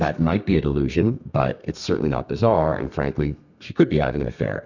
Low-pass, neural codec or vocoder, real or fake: 7.2 kHz; codec, 32 kHz, 1.9 kbps, SNAC; fake